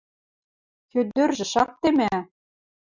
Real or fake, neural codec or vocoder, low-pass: real; none; 7.2 kHz